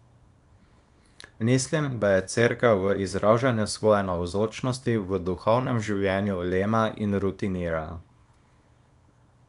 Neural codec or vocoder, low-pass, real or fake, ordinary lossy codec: codec, 24 kHz, 0.9 kbps, WavTokenizer, small release; 10.8 kHz; fake; none